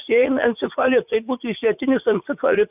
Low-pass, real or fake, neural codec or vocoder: 3.6 kHz; fake; vocoder, 44.1 kHz, 80 mel bands, Vocos